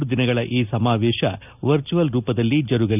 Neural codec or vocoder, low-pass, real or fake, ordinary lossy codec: none; 3.6 kHz; real; none